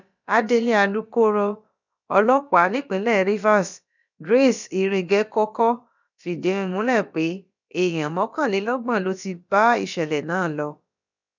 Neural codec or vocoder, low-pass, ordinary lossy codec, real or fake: codec, 16 kHz, about 1 kbps, DyCAST, with the encoder's durations; 7.2 kHz; none; fake